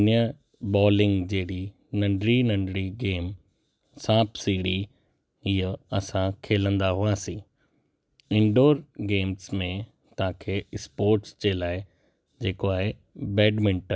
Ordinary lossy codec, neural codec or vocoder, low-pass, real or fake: none; none; none; real